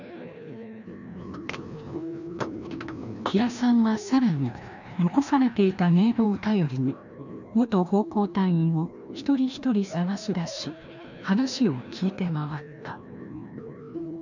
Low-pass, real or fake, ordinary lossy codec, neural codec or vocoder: 7.2 kHz; fake; none; codec, 16 kHz, 1 kbps, FreqCodec, larger model